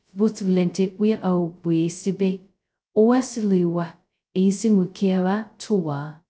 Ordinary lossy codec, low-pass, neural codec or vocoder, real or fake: none; none; codec, 16 kHz, 0.2 kbps, FocalCodec; fake